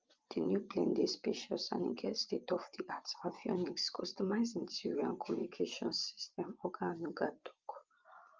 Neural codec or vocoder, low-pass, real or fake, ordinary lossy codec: vocoder, 24 kHz, 100 mel bands, Vocos; 7.2 kHz; fake; Opus, 24 kbps